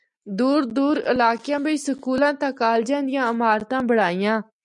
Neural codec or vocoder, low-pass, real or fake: none; 10.8 kHz; real